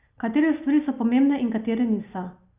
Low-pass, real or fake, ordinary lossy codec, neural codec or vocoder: 3.6 kHz; real; Opus, 64 kbps; none